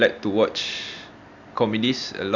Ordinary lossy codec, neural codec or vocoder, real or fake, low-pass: none; none; real; 7.2 kHz